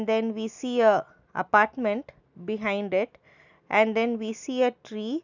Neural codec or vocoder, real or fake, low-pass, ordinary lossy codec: none; real; 7.2 kHz; none